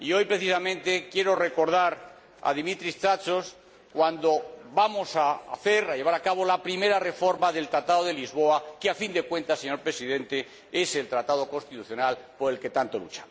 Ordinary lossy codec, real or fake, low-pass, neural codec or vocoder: none; real; none; none